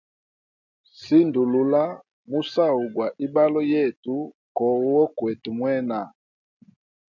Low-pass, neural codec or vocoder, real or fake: 7.2 kHz; none; real